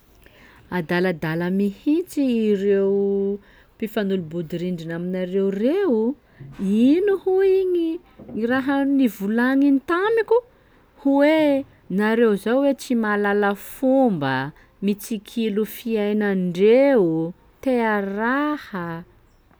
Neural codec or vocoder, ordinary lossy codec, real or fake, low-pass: none; none; real; none